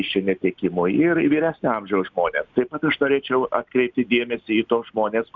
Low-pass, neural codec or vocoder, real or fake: 7.2 kHz; none; real